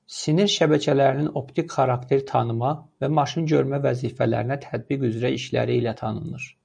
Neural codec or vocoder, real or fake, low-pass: none; real; 9.9 kHz